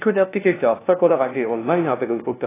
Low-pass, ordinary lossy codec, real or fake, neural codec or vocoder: 3.6 kHz; AAC, 16 kbps; fake; codec, 16 kHz, 0.5 kbps, FunCodec, trained on LibriTTS, 25 frames a second